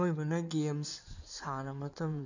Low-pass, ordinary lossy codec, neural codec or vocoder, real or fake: 7.2 kHz; none; codec, 16 kHz in and 24 kHz out, 2.2 kbps, FireRedTTS-2 codec; fake